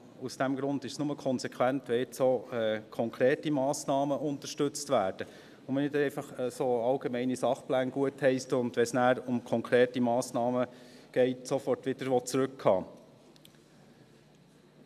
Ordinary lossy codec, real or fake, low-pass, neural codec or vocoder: none; real; 14.4 kHz; none